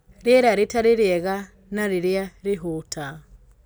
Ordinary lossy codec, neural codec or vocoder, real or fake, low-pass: none; none; real; none